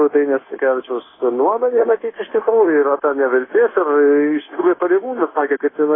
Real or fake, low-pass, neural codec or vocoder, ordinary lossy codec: fake; 7.2 kHz; codec, 16 kHz, 0.9 kbps, LongCat-Audio-Codec; AAC, 16 kbps